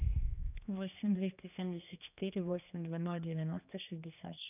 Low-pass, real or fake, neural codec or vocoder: 3.6 kHz; fake; codec, 16 kHz, 2 kbps, X-Codec, HuBERT features, trained on general audio